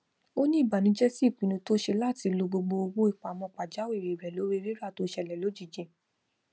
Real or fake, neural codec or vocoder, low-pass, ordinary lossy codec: real; none; none; none